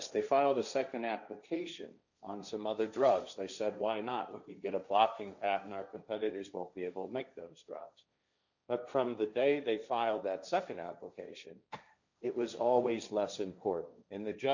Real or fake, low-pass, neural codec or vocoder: fake; 7.2 kHz; codec, 16 kHz, 1.1 kbps, Voila-Tokenizer